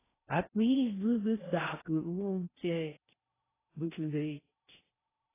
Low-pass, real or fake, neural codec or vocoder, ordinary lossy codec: 3.6 kHz; fake; codec, 16 kHz in and 24 kHz out, 0.6 kbps, FocalCodec, streaming, 4096 codes; AAC, 16 kbps